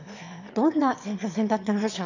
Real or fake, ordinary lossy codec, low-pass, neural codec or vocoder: fake; none; 7.2 kHz; autoencoder, 22.05 kHz, a latent of 192 numbers a frame, VITS, trained on one speaker